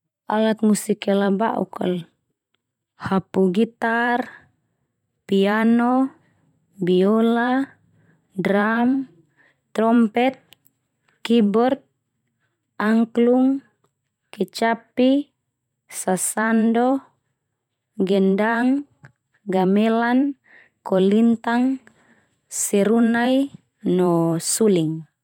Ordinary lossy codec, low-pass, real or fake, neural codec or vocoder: none; 19.8 kHz; fake; vocoder, 44.1 kHz, 128 mel bands every 512 samples, BigVGAN v2